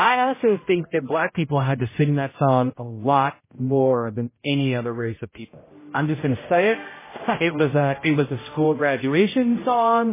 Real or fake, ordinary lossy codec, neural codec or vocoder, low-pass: fake; MP3, 16 kbps; codec, 16 kHz, 0.5 kbps, X-Codec, HuBERT features, trained on general audio; 3.6 kHz